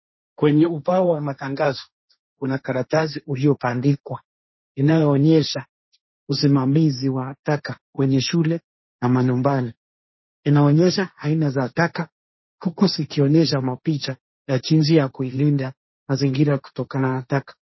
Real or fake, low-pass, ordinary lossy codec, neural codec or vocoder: fake; 7.2 kHz; MP3, 24 kbps; codec, 16 kHz, 1.1 kbps, Voila-Tokenizer